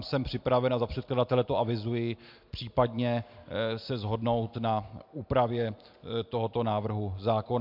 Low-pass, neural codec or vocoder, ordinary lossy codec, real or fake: 5.4 kHz; none; MP3, 48 kbps; real